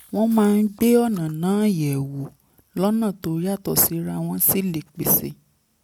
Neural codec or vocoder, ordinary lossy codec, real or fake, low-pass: none; none; real; 19.8 kHz